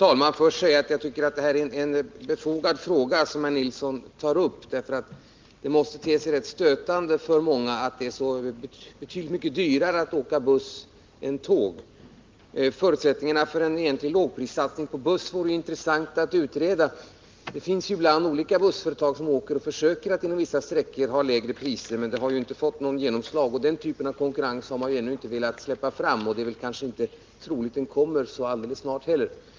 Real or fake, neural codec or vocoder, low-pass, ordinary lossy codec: real; none; 7.2 kHz; Opus, 32 kbps